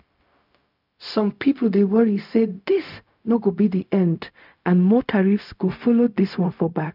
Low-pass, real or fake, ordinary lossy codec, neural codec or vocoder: 5.4 kHz; fake; none; codec, 16 kHz, 0.4 kbps, LongCat-Audio-Codec